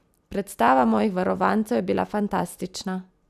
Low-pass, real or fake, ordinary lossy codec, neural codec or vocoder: 14.4 kHz; real; none; none